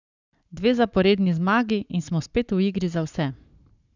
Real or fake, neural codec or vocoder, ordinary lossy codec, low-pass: fake; codec, 44.1 kHz, 7.8 kbps, Pupu-Codec; none; 7.2 kHz